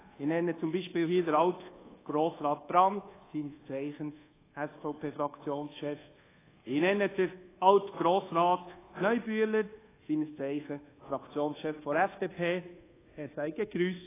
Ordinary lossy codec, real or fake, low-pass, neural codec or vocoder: AAC, 16 kbps; fake; 3.6 kHz; codec, 24 kHz, 1.2 kbps, DualCodec